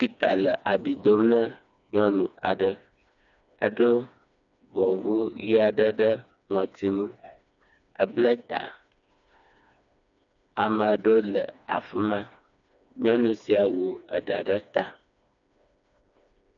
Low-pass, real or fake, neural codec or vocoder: 7.2 kHz; fake; codec, 16 kHz, 2 kbps, FreqCodec, smaller model